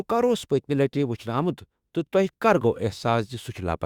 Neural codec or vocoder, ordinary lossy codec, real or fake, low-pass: autoencoder, 48 kHz, 32 numbers a frame, DAC-VAE, trained on Japanese speech; Opus, 64 kbps; fake; 19.8 kHz